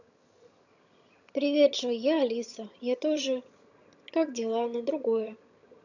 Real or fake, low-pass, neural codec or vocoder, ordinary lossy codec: fake; 7.2 kHz; vocoder, 22.05 kHz, 80 mel bands, HiFi-GAN; none